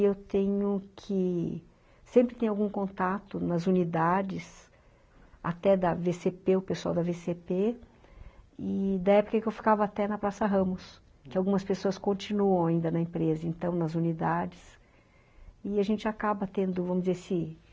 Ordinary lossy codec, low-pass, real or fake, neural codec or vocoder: none; none; real; none